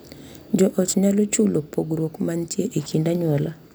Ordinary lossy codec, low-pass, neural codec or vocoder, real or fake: none; none; none; real